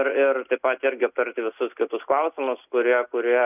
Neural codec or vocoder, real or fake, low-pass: none; real; 3.6 kHz